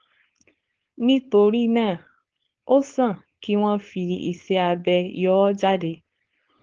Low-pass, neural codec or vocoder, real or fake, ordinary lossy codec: 7.2 kHz; codec, 16 kHz, 4.8 kbps, FACodec; fake; Opus, 16 kbps